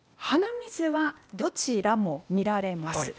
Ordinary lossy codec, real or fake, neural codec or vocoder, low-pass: none; fake; codec, 16 kHz, 0.8 kbps, ZipCodec; none